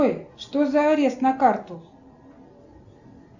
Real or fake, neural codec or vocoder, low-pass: real; none; 7.2 kHz